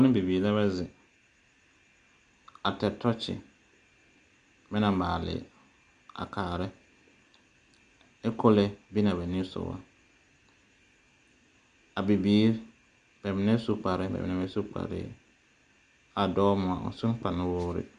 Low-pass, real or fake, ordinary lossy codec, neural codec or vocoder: 9.9 kHz; real; Opus, 64 kbps; none